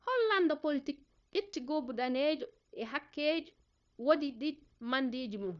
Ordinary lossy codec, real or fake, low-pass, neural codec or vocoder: none; fake; 7.2 kHz; codec, 16 kHz, 0.9 kbps, LongCat-Audio-Codec